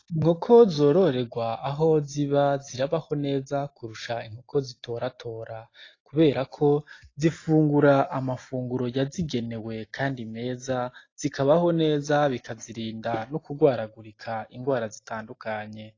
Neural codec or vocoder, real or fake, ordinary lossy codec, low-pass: none; real; AAC, 32 kbps; 7.2 kHz